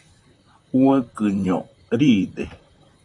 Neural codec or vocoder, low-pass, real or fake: vocoder, 44.1 kHz, 128 mel bands, Pupu-Vocoder; 10.8 kHz; fake